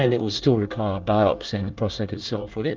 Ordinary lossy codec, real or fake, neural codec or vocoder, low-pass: Opus, 24 kbps; fake; codec, 24 kHz, 1 kbps, SNAC; 7.2 kHz